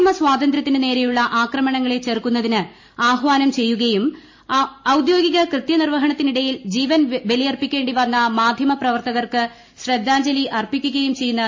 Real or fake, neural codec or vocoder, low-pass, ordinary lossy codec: real; none; 7.2 kHz; MP3, 32 kbps